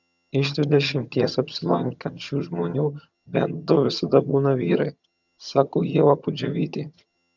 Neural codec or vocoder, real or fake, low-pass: vocoder, 22.05 kHz, 80 mel bands, HiFi-GAN; fake; 7.2 kHz